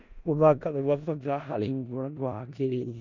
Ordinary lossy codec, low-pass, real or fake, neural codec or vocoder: none; 7.2 kHz; fake; codec, 16 kHz in and 24 kHz out, 0.4 kbps, LongCat-Audio-Codec, four codebook decoder